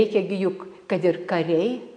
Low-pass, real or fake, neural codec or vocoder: 9.9 kHz; real; none